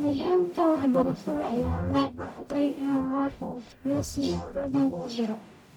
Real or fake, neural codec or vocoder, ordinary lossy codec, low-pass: fake; codec, 44.1 kHz, 0.9 kbps, DAC; MP3, 96 kbps; 19.8 kHz